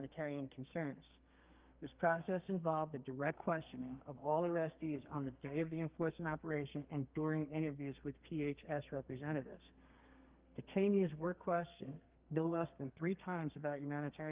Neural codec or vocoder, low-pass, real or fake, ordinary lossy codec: codec, 32 kHz, 1.9 kbps, SNAC; 3.6 kHz; fake; Opus, 24 kbps